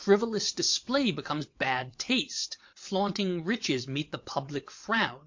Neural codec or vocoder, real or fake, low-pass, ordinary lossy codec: vocoder, 22.05 kHz, 80 mel bands, WaveNeXt; fake; 7.2 kHz; MP3, 48 kbps